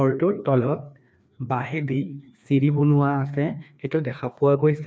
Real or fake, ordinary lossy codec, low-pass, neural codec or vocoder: fake; none; none; codec, 16 kHz, 2 kbps, FreqCodec, larger model